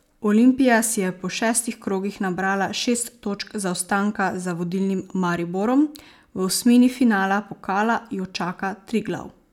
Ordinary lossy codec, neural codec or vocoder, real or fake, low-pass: none; none; real; 19.8 kHz